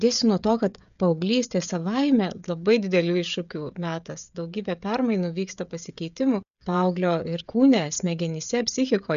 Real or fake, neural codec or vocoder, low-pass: fake; codec, 16 kHz, 16 kbps, FreqCodec, smaller model; 7.2 kHz